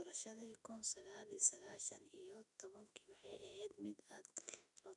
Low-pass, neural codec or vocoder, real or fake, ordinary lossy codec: 10.8 kHz; autoencoder, 48 kHz, 32 numbers a frame, DAC-VAE, trained on Japanese speech; fake; none